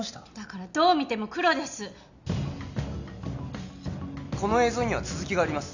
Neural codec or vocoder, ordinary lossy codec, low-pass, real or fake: none; none; 7.2 kHz; real